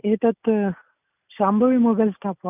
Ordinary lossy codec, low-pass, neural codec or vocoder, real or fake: none; 3.6 kHz; none; real